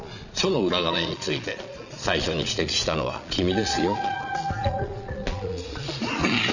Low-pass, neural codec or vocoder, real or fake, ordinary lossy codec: 7.2 kHz; vocoder, 22.05 kHz, 80 mel bands, Vocos; fake; none